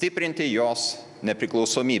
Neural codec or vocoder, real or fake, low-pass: none; real; 10.8 kHz